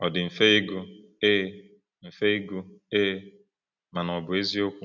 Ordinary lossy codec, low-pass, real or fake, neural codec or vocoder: none; 7.2 kHz; real; none